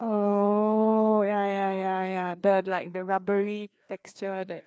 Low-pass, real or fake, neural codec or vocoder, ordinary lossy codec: none; fake; codec, 16 kHz, 2 kbps, FreqCodec, larger model; none